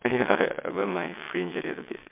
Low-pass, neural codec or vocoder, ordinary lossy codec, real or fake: 3.6 kHz; vocoder, 22.05 kHz, 80 mel bands, WaveNeXt; MP3, 32 kbps; fake